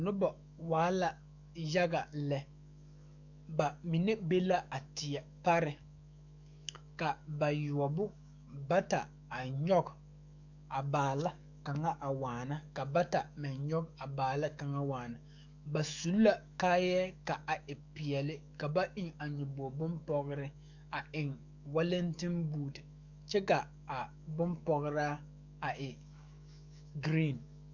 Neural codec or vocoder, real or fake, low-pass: autoencoder, 48 kHz, 128 numbers a frame, DAC-VAE, trained on Japanese speech; fake; 7.2 kHz